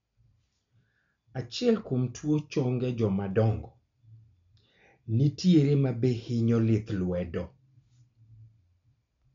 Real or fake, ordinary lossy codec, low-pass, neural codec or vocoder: real; MP3, 48 kbps; 7.2 kHz; none